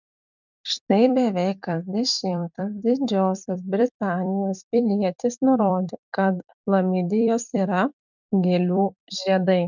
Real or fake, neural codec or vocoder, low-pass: fake; vocoder, 44.1 kHz, 128 mel bands every 256 samples, BigVGAN v2; 7.2 kHz